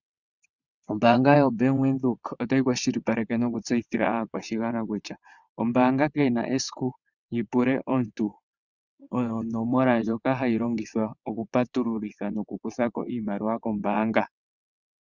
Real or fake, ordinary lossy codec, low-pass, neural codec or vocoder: fake; Opus, 64 kbps; 7.2 kHz; vocoder, 22.05 kHz, 80 mel bands, WaveNeXt